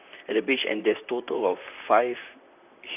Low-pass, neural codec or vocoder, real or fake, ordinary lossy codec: 3.6 kHz; vocoder, 44.1 kHz, 128 mel bands, Pupu-Vocoder; fake; none